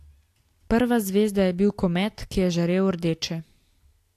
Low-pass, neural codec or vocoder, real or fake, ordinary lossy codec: 14.4 kHz; none; real; AAC, 64 kbps